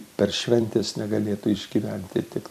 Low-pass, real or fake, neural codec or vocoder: 14.4 kHz; fake; vocoder, 44.1 kHz, 128 mel bands every 256 samples, BigVGAN v2